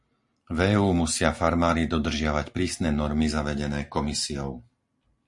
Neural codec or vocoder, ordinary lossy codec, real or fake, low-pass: none; MP3, 48 kbps; real; 10.8 kHz